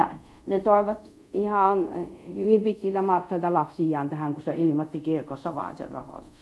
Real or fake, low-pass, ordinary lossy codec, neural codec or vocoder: fake; none; none; codec, 24 kHz, 0.5 kbps, DualCodec